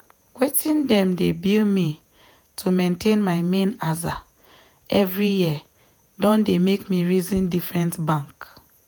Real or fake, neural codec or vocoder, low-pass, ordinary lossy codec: fake; vocoder, 48 kHz, 128 mel bands, Vocos; none; none